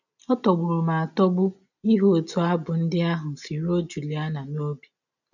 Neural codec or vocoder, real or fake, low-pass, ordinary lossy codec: none; real; 7.2 kHz; none